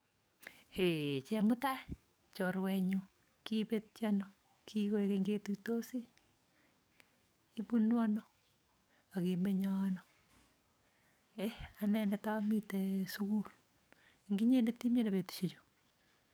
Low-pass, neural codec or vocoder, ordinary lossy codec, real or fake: none; codec, 44.1 kHz, 7.8 kbps, DAC; none; fake